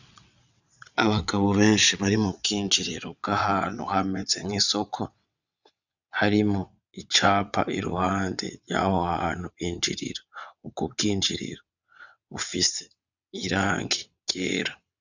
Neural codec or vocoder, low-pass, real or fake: vocoder, 22.05 kHz, 80 mel bands, WaveNeXt; 7.2 kHz; fake